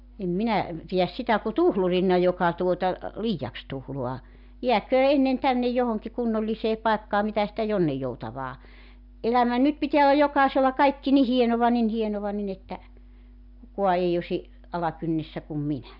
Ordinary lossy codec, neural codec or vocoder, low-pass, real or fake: none; none; 5.4 kHz; real